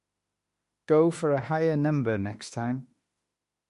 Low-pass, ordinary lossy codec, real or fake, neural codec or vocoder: 14.4 kHz; MP3, 48 kbps; fake; autoencoder, 48 kHz, 32 numbers a frame, DAC-VAE, trained on Japanese speech